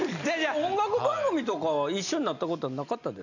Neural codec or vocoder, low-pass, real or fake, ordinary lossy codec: none; 7.2 kHz; real; none